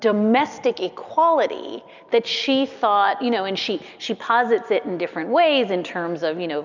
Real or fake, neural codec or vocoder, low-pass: real; none; 7.2 kHz